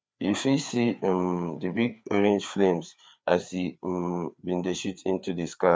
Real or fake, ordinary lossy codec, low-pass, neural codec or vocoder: fake; none; none; codec, 16 kHz, 4 kbps, FreqCodec, larger model